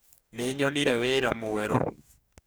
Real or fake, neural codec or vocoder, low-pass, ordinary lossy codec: fake; codec, 44.1 kHz, 2.6 kbps, DAC; none; none